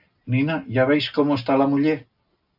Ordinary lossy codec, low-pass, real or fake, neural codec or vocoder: AAC, 48 kbps; 5.4 kHz; real; none